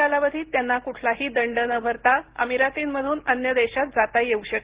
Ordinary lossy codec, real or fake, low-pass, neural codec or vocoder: Opus, 16 kbps; real; 3.6 kHz; none